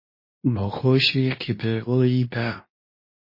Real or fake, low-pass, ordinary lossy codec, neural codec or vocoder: fake; 5.4 kHz; MP3, 24 kbps; codec, 24 kHz, 0.9 kbps, WavTokenizer, medium speech release version 2